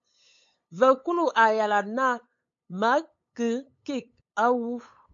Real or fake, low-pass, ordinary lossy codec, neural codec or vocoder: fake; 7.2 kHz; MP3, 48 kbps; codec, 16 kHz, 8 kbps, FunCodec, trained on LibriTTS, 25 frames a second